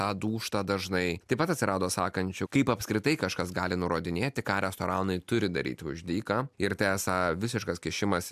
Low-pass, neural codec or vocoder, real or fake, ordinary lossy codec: 14.4 kHz; none; real; MP3, 96 kbps